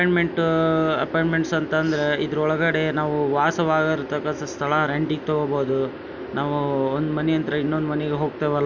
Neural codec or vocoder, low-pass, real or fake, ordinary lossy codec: none; 7.2 kHz; real; none